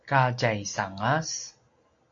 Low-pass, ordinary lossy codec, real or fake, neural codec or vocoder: 7.2 kHz; AAC, 48 kbps; real; none